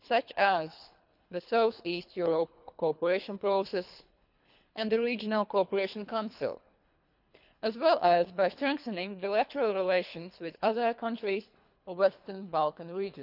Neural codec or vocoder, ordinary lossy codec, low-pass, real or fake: codec, 24 kHz, 3 kbps, HILCodec; none; 5.4 kHz; fake